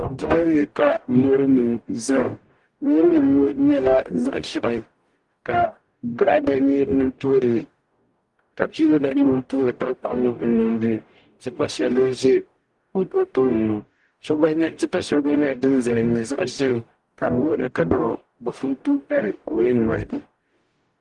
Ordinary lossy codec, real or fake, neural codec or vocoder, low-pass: Opus, 24 kbps; fake; codec, 44.1 kHz, 0.9 kbps, DAC; 10.8 kHz